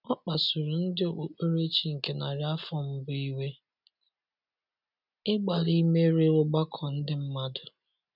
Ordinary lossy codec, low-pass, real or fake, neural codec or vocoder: none; 5.4 kHz; real; none